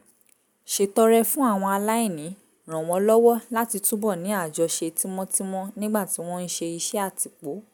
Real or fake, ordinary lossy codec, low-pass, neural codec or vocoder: real; none; none; none